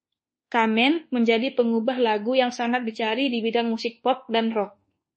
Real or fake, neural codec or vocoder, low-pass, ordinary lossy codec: fake; codec, 24 kHz, 1.2 kbps, DualCodec; 10.8 kHz; MP3, 32 kbps